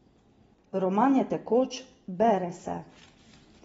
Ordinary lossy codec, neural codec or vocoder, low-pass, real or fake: AAC, 24 kbps; none; 14.4 kHz; real